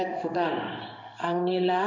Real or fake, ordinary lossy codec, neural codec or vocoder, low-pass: fake; none; codec, 16 kHz in and 24 kHz out, 1 kbps, XY-Tokenizer; 7.2 kHz